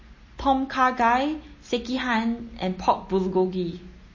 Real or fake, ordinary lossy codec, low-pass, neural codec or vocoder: real; MP3, 32 kbps; 7.2 kHz; none